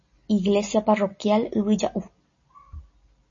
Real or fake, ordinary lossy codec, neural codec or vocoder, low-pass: real; MP3, 32 kbps; none; 7.2 kHz